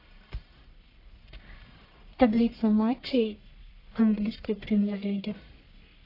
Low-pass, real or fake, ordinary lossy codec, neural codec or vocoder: 5.4 kHz; fake; AAC, 32 kbps; codec, 44.1 kHz, 1.7 kbps, Pupu-Codec